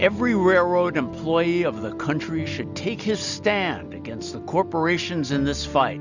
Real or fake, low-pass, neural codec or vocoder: real; 7.2 kHz; none